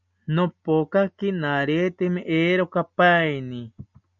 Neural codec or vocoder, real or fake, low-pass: none; real; 7.2 kHz